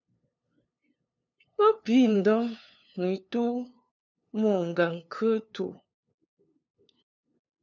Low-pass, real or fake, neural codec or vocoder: 7.2 kHz; fake; codec, 16 kHz, 2 kbps, FunCodec, trained on LibriTTS, 25 frames a second